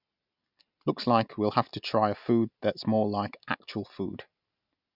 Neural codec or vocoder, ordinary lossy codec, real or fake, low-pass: none; none; real; 5.4 kHz